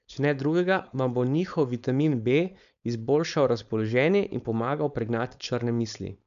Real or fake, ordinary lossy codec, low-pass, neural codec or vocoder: fake; none; 7.2 kHz; codec, 16 kHz, 4.8 kbps, FACodec